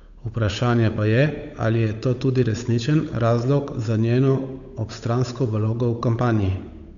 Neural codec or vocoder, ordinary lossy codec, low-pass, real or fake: codec, 16 kHz, 8 kbps, FunCodec, trained on Chinese and English, 25 frames a second; none; 7.2 kHz; fake